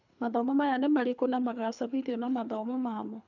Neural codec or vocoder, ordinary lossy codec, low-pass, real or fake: codec, 24 kHz, 3 kbps, HILCodec; none; 7.2 kHz; fake